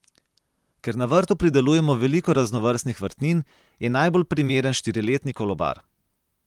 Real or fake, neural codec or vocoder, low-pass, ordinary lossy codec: fake; vocoder, 44.1 kHz, 128 mel bands, Pupu-Vocoder; 19.8 kHz; Opus, 32 kbps